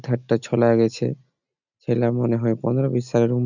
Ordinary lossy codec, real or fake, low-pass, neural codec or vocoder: none; real; 7.2 kHz; none